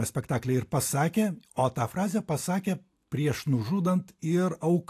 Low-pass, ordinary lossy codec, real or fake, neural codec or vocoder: 14.4 kHz; AAC, 64 kbps; real; none